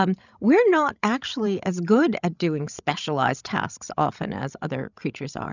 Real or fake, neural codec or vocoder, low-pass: fake; codec, 16 kHz, 16 kbps, FreqCodec, larger model; 7.2 kHz